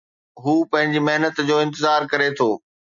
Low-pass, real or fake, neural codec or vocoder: 7.2 kHz; real; none